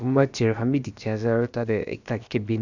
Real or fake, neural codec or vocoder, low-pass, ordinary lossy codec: fake; codec, 16 kHz, about 1 kbps, DyCAST, with the encoder's durations; 7.2 kHz; none